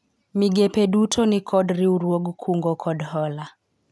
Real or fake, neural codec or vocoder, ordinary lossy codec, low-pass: real; none; none; none